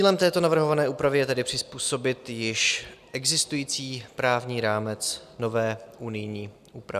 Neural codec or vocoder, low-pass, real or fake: none; 14.4 kHz; real